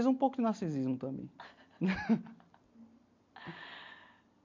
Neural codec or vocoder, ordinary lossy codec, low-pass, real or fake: none; MP3, 48 kbps; 7.2 kHz; real